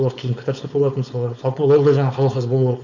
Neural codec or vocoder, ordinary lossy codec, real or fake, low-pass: codec, 16 kHz, 4.8 kbps, FACodec; none; fake; 7.2 kHz